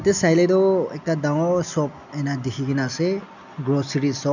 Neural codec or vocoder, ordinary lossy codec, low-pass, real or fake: none; none; 7.2 kHz; real